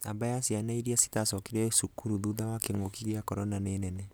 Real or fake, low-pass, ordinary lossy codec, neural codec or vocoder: real; none; none; none